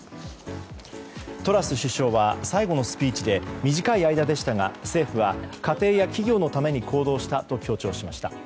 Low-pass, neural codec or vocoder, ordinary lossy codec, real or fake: none; none; none; real